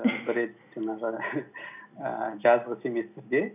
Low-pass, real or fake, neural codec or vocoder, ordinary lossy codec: 3.6 kHz; real; none; none